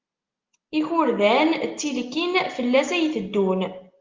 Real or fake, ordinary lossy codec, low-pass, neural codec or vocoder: real; Opus, 24 kbps; 7.2 kHz; none